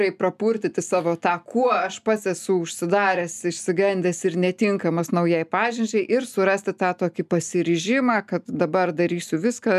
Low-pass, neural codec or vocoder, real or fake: 14.4 kHz; none; real